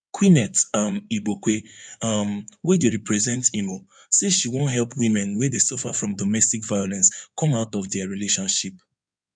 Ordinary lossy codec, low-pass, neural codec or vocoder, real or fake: none; 9.9 kHz; codec, 16 kHz in and 24 kHz out, 2.2 kbps, FireRedTTS-2 codec; fake